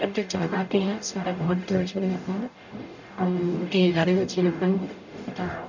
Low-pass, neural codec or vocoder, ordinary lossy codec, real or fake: 7.2 kHz; codec, 44.1 kHz, 0.9 kbps, DAC; none; fake